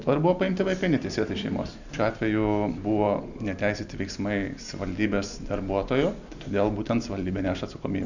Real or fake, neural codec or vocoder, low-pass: real; none; 7.2 kHz